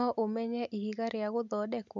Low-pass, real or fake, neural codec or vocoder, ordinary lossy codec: 7.2 kHz; real; none; none